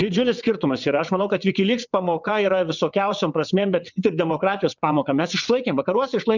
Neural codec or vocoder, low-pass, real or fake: vocoder, 22.05 kHz, 80 mel bands, WaveNeXt; 7.2 kHz; fake